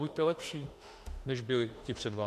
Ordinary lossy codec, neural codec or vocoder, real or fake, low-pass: AAC, 64 kbps; autoencoder, 48 kHz, 32 numbers a frame, DAC-VAE, trained on Japanese speech; fake; 14.4 kHz